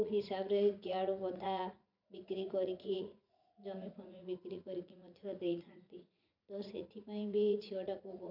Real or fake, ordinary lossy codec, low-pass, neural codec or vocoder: fake; none; 5.4 kHz; vocoder, 44.1 kHz, 80 mel bands, Vocos